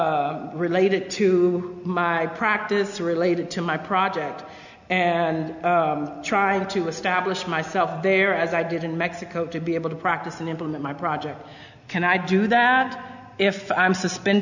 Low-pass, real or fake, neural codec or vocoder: 7.2 kHz; real; none